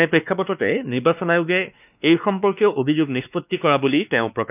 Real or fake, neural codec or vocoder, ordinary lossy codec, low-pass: fake; codec, 16 kHz, 2 kbps, X-Codec, WavLM features, trained on Multilingual LibriSpeech; none; 3.6 kHz